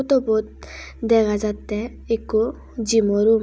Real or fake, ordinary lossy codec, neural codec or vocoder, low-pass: real; none; none; none